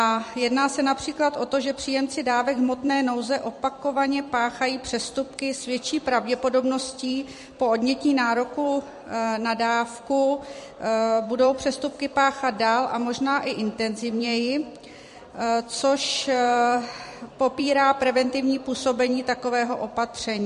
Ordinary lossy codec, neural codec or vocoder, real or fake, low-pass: MP3, 48 kbps; vocoder, 44.1 kHz, 128 mel bands every 256 samples, BigVGAN v2; fake; 14.4 kHz